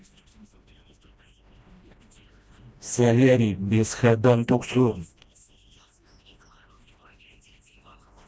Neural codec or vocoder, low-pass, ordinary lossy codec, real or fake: codec, 16 kHz, 1 kbps, FreqCodec, smaller model; none; none; fake